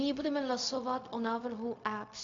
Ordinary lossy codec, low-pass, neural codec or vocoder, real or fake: AAC, 48 kbps; 7.2 kHz; codec, 16 kHz, 0.4 kbps, LongCat-Audio-Codec; fake